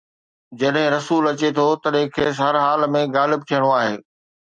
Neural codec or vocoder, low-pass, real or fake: none; 9.9 kHz; real